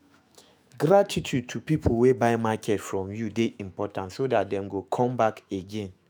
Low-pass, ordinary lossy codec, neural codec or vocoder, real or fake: none; none; autoencoder, 48 kHz, 128 numbers a frame, DAC-VAE, trained on Japanese speech; fake